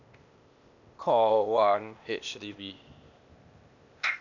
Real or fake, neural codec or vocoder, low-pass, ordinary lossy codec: fake; codec, 16 kHz, 0.8 kbps, ZipCodec; 7.2 kHz; none